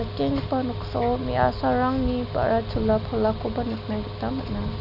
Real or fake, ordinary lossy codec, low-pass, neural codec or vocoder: real; none; 5.4 kHz; none